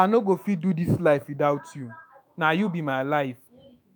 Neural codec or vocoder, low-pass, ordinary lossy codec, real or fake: autoencoder, 48 kHz, 128 numbers a frame, DAC-VAE, trained on Japanese speech; none; none; fake